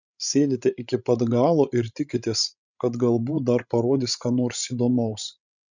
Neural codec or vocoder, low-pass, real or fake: codec, 16 kHz, 16 kbps, FreqCodec, larger model; 7.2 kHz; fake